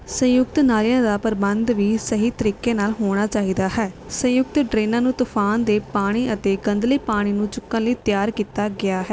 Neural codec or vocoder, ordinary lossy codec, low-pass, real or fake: none; none; none; real